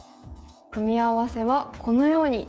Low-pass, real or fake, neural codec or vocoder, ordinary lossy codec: none; fake; codec, 16 kHz, 8 kbps, FreqCodec, smaller model; none